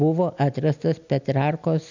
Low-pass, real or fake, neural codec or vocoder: 7.2 kHz; real; none